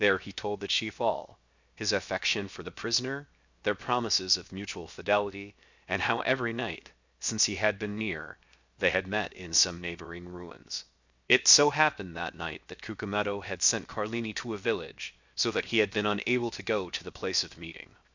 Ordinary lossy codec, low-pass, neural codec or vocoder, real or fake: Opus, 64 kbps; 7.2 kHz; codec, 16 kHz, 0.7 kbps, FocalCodec; fake